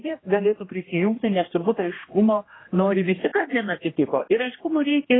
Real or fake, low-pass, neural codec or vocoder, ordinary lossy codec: fake; 7.2 kHz; codec, 16 kHz, 1 kbps, X-Codec, HuBERT features, trained on general audio; AAC, 16 kbps